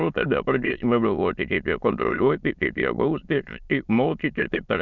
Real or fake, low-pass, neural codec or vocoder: fake; 7.2 kHz; autoencoder, 22.05 kHz, a latent of 192 numbers a frame, VITS, trained on many speakers